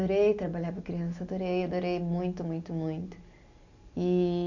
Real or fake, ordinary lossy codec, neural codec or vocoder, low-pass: real; none; none; 7.2 kHz